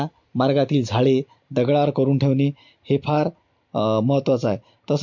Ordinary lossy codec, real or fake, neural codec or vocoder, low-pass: MP3, 48 kbps; real; none; 7.2 kHz